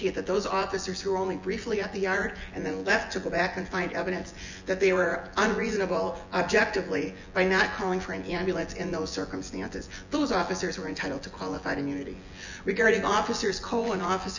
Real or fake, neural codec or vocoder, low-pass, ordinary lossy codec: fake; vocoder, 24 kHz, 100 mel bands, Vocos; 7.2 kHz; Opus, 64 kbps